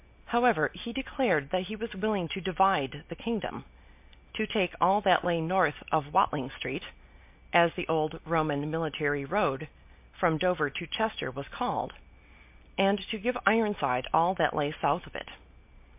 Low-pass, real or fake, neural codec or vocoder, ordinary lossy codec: 3.6 kHz; real; none; MP3, 32 kbps